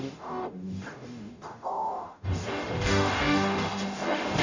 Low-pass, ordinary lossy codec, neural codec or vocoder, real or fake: 7.2 kHz; none; codec, 44.1 kHz, 0.9 kbps, DAC; fake